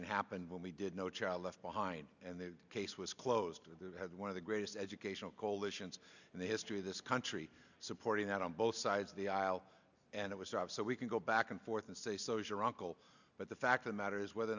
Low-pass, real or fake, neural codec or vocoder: 7.2 kHz; real; none